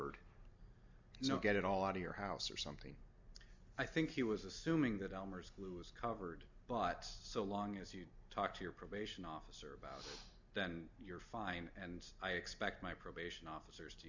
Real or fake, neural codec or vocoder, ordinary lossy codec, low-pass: real; none; MP3, 64 kbps; 7.2 kHz